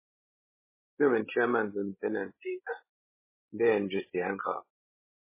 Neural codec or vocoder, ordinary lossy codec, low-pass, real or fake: codec, 16 kHz, 16 kbps, FreqCodec, larger model; MP3, 16 kbps; 3.6 kHz; fake